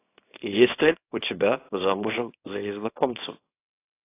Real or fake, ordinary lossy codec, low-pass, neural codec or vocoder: fake; AAC, 24 kbps; 3.6 kHz; codec, 24 kHz, 0.9 kbps, WavTokenizer, small release